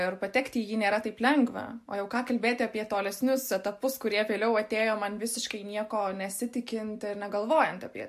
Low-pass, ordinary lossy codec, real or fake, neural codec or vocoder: 14.4 kHz; MP3, 64 kbps; real; none